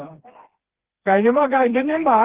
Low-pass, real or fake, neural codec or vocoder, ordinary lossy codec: 3.6 kHz; fake; codec, 16 kHz, 2 kbps, FreqCodec, smaller model; Opus, 16 kbps